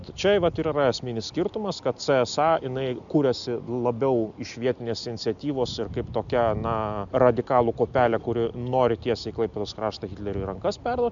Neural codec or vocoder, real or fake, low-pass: none; real; 7.2 kHz